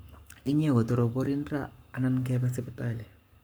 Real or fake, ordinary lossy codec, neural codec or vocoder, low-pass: fake; none; codec, 44.1 kHz, 7.8 kbps, Pupu-Codec; none